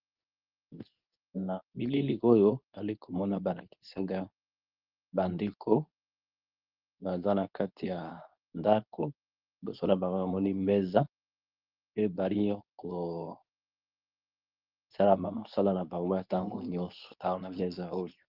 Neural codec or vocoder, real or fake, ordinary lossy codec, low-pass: codec, 24 kHz, 0.9 kbps, WavTokenizer, medium speech release version 2; fake; Opus, 24 kbps; 5.4 kHz